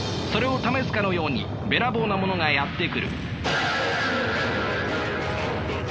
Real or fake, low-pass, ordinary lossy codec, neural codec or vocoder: real; none; none; none